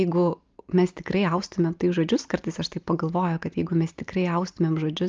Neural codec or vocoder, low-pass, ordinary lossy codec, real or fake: none; 7.2 kHz; Opus, 24 kbps; real